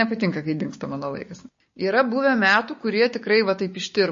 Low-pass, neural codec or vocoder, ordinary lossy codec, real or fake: 7.2 kHz; codec, 16 kHz, 6 kbps, DAC; MP3, 32 kbps; fake